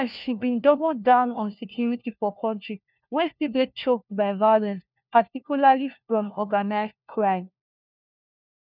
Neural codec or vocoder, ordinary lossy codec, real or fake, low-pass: codec, 16 kHz, 1 kbps, FunCodec, trained on LibriTTS, 50 frames a second; none; fake; 5.4 kHz